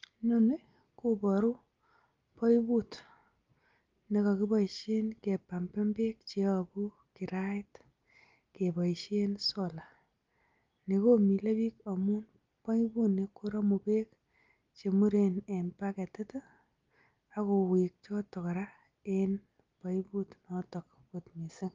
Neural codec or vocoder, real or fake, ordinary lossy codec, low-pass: none; real; Opus, 32 kbps; 7.2 kHz